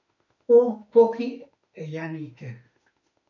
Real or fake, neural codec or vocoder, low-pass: fake; autoencoder, 48 kHz, 32 numbers a frame, DAC-VAE, trained on Japanese speech; 7.2 kHz